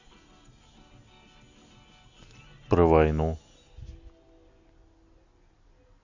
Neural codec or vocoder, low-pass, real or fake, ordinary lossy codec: none; 7.2 kHz; real; Opus, 64 kbps